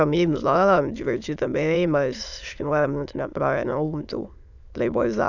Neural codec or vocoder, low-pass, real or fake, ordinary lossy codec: autoencoder, 22.05 kHz, a latent of 192 numbers a frame, VITS, trained on many speakers; 7.2 kHz; fake; none